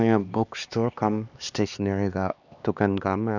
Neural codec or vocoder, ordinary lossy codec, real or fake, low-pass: codec, 16 kHz, 2 kbps, X-Codec, HuBERT features, trained on LibriSpeech; none; fake; 7.2 kHz